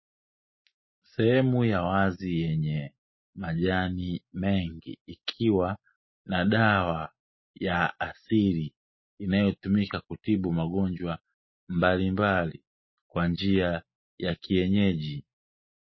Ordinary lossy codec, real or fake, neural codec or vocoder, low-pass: MP3, 24 kbps; real; none; 7.2 kHz